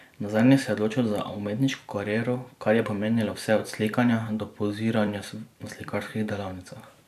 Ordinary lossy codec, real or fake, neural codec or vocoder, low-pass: none; fake; vocoder, 44.1 kHz, 128 mel bands every 512 samples, BigVGAN v2; 14.4 kHz